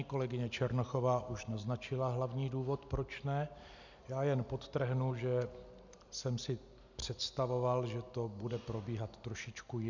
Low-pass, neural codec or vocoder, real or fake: 7.2 kHz; none; real